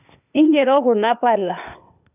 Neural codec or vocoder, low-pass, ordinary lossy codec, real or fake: codec, 16 kHz, 0.8 kbps, ZipCodec; 3.6 kHz; none; fake